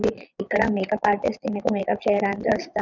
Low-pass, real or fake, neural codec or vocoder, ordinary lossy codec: 7.2 kHz; fake; vocoder, 22.05 kHz, 80 mel bands, Vocos; none